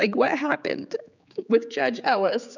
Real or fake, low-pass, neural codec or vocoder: fake; 7.2 kHz; codec, 16 kHz, 4 kbps, X-Codec, HuBERT features, trained on general audio